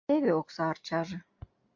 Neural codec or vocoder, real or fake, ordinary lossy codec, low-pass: none; real; MP3, 64 kbps; 7.2 kHz